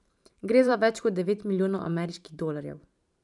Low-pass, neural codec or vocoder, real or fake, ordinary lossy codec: 10.8 kHz; vocoder, 44.1 kHz, 128 mel bands every 512 samples, BigVGAN v2; fake; none